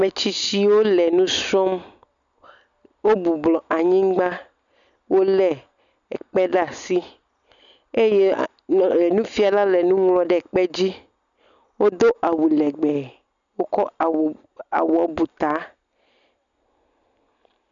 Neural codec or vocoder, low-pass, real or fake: none; 7.2 kHz; real